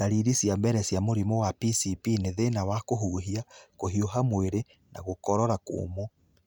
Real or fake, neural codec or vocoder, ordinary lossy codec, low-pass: real; none; none; none